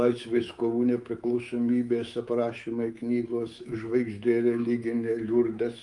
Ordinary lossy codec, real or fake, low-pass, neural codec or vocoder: Opus, 32 kbps; fake; 10.8 kHz; codec, 24 kHz, 3.1 kbps, DualCodec